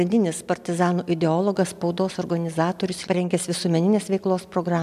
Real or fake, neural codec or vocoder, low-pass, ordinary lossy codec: real; none; 14.4 kHz; MP3, 96 kbps